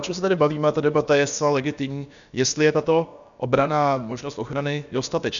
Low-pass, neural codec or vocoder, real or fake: 7.2 kHz; codec, 16 kHz, about 1 kbps, DyCAST, with the encoder's durations; fake